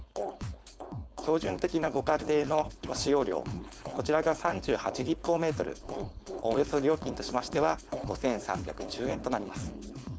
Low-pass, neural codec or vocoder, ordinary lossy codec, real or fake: none; codec, 16 kHz, 4.8 kbps, FACodec; none; fake